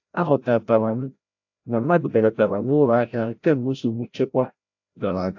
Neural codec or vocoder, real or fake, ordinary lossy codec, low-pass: codec, 16 kHz, 0.5 kbps, FreqCodec, larger model; fake; AAC, 48 kbps; 7.2 kHz